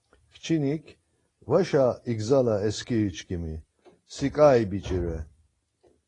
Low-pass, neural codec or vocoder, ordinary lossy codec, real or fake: 10.8 kHz; none; AAC, 32 kbps; real